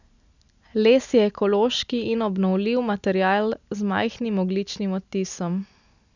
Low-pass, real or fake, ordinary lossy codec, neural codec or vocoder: 7.2 kHz; real; none; none